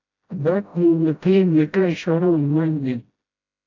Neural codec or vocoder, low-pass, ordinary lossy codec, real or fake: codec, 16 kHz, 0.5 kbps, FreqCodec, smaller model; 7.2 kHz; AAC, 48 kbps; fake